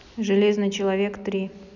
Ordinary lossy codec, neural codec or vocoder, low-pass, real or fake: none; none; 7.2 kHz; real